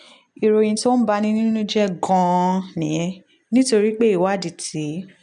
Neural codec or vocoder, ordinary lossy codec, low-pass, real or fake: none; none; 9.9 kHz; real